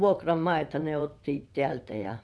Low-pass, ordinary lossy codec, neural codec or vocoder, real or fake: none; none; vocoder, 22.05 kHz, 80 mel bands, WaveNeXt; fake